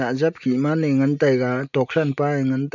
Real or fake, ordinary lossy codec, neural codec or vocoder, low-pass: real; none; none; 7.2 kHz